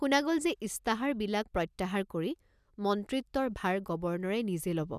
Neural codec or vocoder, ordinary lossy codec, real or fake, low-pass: none; none; real; 14.4 kHz